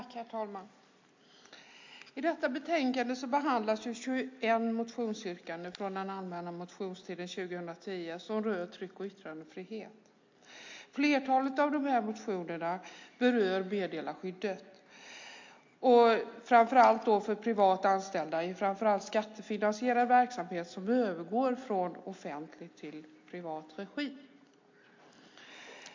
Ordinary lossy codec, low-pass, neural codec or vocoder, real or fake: MP3, 48 kbps; 7.2 kHz; none; real